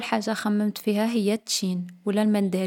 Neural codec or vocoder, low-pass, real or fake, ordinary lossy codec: none; 19.8 kHz; real; none